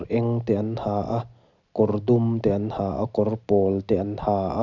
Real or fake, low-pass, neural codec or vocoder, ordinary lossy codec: real; 7.2 kHz; none; none